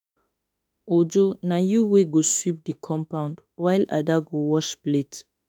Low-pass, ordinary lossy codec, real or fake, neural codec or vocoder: none; none; fake; autoencoder, 48 kHz, 32 numbers a frame, DAC-VAE, trained on Japanese speech